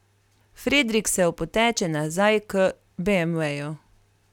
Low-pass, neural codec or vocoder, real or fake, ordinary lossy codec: 19.8 kHz; none; real; none